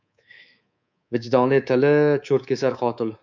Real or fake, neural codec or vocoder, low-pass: fake; codec, 24 kHz, 3.1 kbps, DualCodec; 7.2 kHz